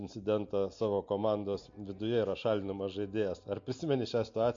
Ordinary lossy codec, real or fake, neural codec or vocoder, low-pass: MP3, 48 kbps; real; none; 7.2 kHz